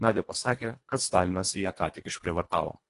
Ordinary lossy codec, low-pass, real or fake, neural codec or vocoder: AAC, 48 kbps; 10.8 kHz; fake; codec, 24 kHz, 1.5 kbps, HILCodec